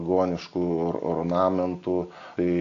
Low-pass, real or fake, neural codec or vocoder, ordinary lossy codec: 7.2 kHz; real; none; AAC, 48 kbps